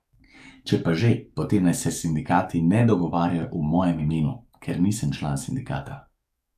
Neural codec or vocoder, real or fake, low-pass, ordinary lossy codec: codec, 44.1 kHz, 7.8 kbps, DAC; fake; 14.4 kHz; AAC, 96 kbps